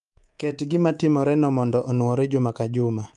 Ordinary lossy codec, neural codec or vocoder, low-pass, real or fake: Opus, 32 kbps; codec, 24 kHz, 3.1 kbps, DualCodec; 10.8 kHz; fake